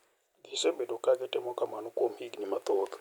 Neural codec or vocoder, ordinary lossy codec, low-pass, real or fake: none; none; none; real